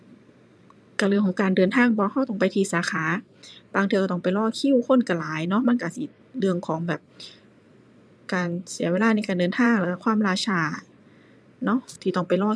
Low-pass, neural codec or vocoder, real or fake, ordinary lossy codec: none; vocoder, 22.05 kHz, 80 mel bands, Vocos; fake; none